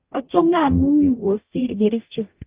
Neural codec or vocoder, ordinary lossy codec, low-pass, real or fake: codec, 44.1 kHz, 0.9 kbps, DAC; Opus, 24 kbps; 3.6 kHz; fake